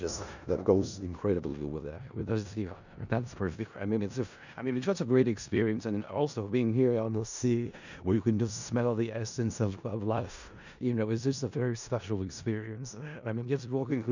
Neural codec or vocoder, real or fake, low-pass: codec, 16 kHz in and 24 kHz out, 0.4 kbps, LongCat-Audio-Codec, four codebook decoder; fake; 7.2 kHz